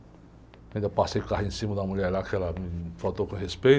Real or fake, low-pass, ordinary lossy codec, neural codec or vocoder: real; none; none; none